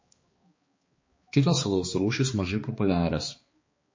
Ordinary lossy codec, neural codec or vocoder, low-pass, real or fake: MP3, 32 kbps; codec, 16 kHz, 2 kbps, X-Codec, HuBERT features, trained on balanced general audio; 7.2 kHz; fake